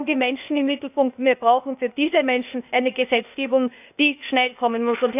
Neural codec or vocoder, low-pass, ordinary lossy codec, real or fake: codec, 16 kHz, 0.8 kbps, ZipCodec; 3.6 kHz; none; fake